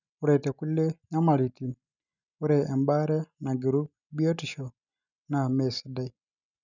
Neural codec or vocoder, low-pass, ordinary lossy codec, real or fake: none; 7.2 kHz; none; real